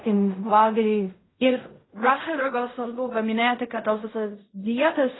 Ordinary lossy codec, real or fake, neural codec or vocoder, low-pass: AAC, 16 kbps; fake; codec, 16 kHz in and 24 kHz out, 0.4 kbps, LongCat-Audio-Codec, fine tuned four codebook decoder; 7.2 kHz